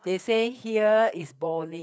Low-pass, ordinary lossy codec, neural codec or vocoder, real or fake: none; none; codec, 16 kHz, 4 kbps, FreqCodec, larger model; fake